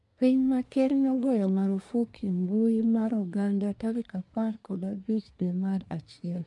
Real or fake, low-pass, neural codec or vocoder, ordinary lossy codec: fake; 10.8 kHz; codec, 24 kHz, 1 kbps, SNAC; none